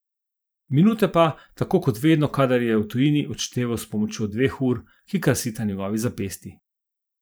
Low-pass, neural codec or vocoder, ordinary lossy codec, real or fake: none; vocoder, 44.1 kHz, 128 mel bands every 512 samples, BigVGAN v2; none; fake